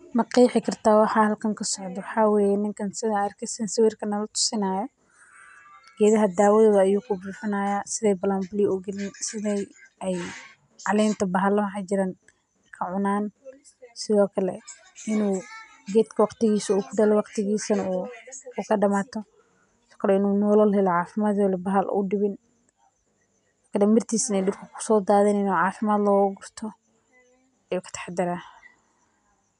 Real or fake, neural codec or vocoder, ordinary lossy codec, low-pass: real; none; none; 10.8 kHz